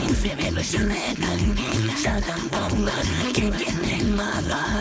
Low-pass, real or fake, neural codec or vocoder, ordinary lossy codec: none; fake; codec, 16 kHz, 4.8 kbps, FACodec; none